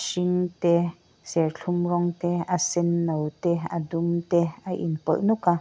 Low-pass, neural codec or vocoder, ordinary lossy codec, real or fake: none; none; none; real